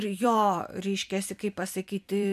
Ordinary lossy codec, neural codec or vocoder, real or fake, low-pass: MP3, 96 kbps; vocoder, 44.1 kHz, 128 mel bands every 256 samples, BigVGAN v2; fake; 14.4 kHz